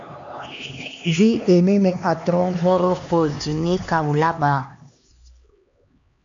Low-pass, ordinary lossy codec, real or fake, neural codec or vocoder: 7.2 kHz; AAC, 64 kbps; fake; codec, 16 kHz, 2 kbps, X-Codec, HuBERT features, trained on LibriSpeech